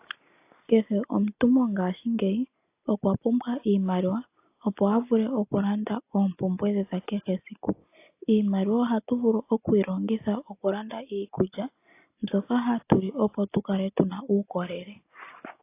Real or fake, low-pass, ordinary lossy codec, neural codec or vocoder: real; 3.6 kHz; AAC, 24 kbps; none